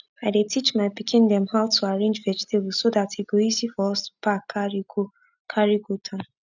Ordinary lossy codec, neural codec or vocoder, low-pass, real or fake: none; none; 7.2 kHz; real